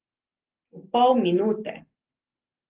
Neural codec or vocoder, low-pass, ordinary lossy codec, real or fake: none; 3.6 kHz; Opus, 16 kbps; real